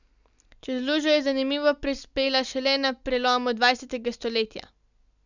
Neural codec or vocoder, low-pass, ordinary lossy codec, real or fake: none; 7.2 kHz; none; real